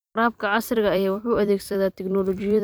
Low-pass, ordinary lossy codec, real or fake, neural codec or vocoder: none; none; fake; vocoder, 44.1 kHz, 128 mel bands every 256 samples, BigVGAN v2